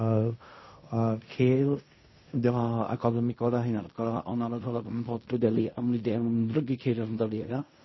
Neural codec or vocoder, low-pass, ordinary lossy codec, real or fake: codec, 16 kHz in and 24 kHz out, 0.4 kbps, LongCat-Audio-Codec, fine tuned four codebook decoder; 7.2 kHz; MP3, 24 kbps; fake